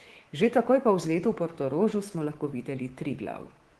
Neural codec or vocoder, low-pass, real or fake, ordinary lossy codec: codec, 24 kHz, 3.1 kbps, DualCodec; 10.8 kHz; fake; Opus, 16 kbps